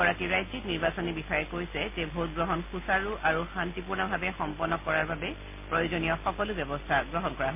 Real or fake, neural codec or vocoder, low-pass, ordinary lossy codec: real; none; 3.6 kHz; MP3, 24 kbps